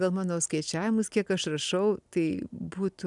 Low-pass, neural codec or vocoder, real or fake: 10.8 kHz; none; real